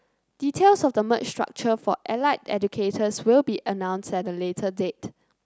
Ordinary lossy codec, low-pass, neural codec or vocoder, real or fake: none; none; none; real